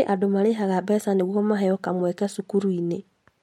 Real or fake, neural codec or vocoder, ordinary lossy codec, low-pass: real; none; MP3, 64 kbps; 19.8 kHz